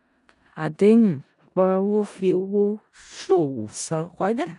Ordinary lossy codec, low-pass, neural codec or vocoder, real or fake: none; 10.8 kHz; codec, 16 kHz in and 24 kHz out, 0.4 kbps, LongCat-Audio-Codec, four codebook decoder; fake